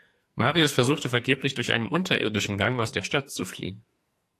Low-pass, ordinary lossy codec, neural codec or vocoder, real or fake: 14.4 kHz; AAC, 64 kbps; codec, 44.1 kHz, 2.6 kbps, SNAC; fake